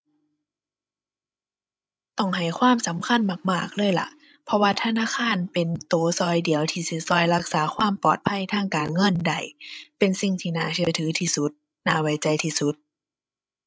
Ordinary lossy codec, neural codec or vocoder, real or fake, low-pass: none; codec, 16 kHz, 16 kbps, FreqCodec, larger model; fake; none